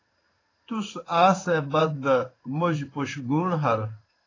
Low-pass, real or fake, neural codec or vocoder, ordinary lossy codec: 7.2 kHz; fake; codec, 16 kHz in and 24 kHz out, 1 kbps, XY-Tokenizer; AAC, 32 kbps